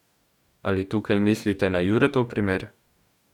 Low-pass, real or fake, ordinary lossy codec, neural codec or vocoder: 19.8 kHz; fake; none; codec, 44.1 kHz, 2.6 kbps, DAC